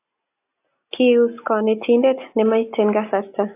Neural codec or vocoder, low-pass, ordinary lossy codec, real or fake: none; 3.6 kHz; AAC, 24 kbps; real